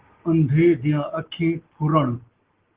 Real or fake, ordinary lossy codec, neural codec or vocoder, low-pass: real; Opus, 16 kbps; none; 3.6 kHz